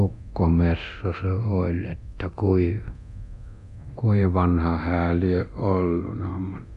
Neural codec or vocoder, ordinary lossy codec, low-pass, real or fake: codec, 24 kHz, 0.9 kbps, DualCodec; none; 10.8 kHz; fake